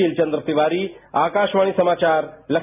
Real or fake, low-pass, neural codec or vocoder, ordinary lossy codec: real; 3.6 kHz; none; none